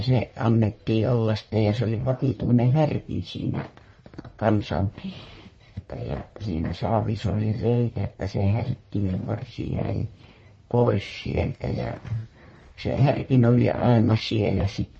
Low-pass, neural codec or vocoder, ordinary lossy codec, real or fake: 9.9 kHz; codec, 44.1 kHz, 1.7 kbps, Pupu-Codec; MP3, 32 kbps; fake